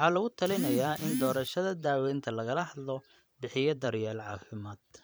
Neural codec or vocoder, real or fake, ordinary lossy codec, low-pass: vocoder, 44.1 kHz, 128 mel bands every 512 samples, BigVGAN v2; fake; none; none